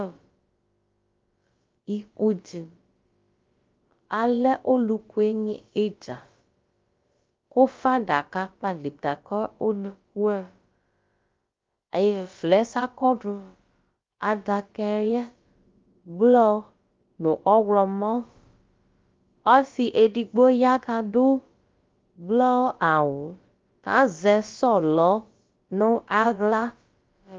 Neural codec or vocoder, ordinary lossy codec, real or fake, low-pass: codec, 16 kHz, about 1 kbps, DyCAST, with the encoder's durations; Opus, 32 kbps; fake; 7.2 kHz